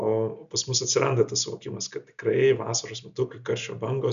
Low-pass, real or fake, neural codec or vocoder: 7.2 kHz; real; none